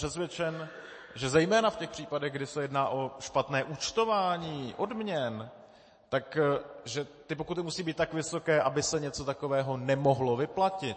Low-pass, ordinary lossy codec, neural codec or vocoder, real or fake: 9.9 kHz; MP3, 32 kbps; none; real